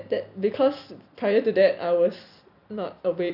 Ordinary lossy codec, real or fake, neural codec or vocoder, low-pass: none; real; none; 5.4 kHz